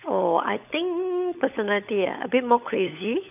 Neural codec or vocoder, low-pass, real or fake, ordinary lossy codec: codec, 16 kHz, 16 kbps, FunCodec, trained on LibriTTS, 50 frames a second; 3.6 kHz; fake; none